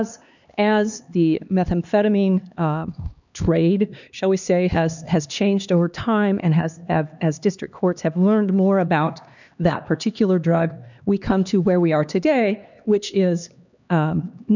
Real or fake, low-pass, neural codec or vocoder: fake; 7.2 kHz; codec, 16 kHz, 2 kbps, X-Codec, HuBERT features, trained on LibriSpeech